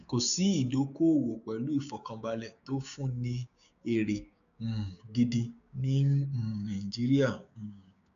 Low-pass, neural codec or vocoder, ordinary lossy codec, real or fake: 7.2 kHz; codec, 16 kHz, 6 kbps, DAC; none; fake